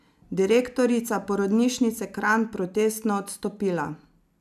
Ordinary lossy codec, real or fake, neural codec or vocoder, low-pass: none; real; none; 14.4 kHz